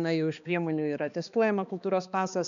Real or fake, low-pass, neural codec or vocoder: fake; 7.2 kHz; codec, 16 kHz, 2 kbps, X-Codec, HuBERT features, trained on balanced general audio